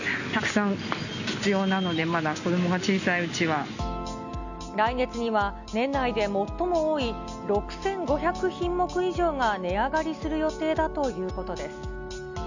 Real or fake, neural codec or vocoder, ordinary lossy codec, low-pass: real; none; none; 7.2 kHz